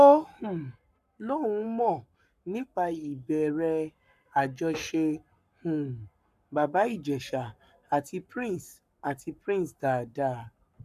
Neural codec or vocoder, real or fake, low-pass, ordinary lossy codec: codec, 44.1 kHz, 7.8 kbps, Pupu-Codec; fake; 14.4 kHz; none